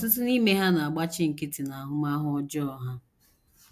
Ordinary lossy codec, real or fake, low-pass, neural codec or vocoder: AAC, 96 kbps; real; 14.4 kHz; none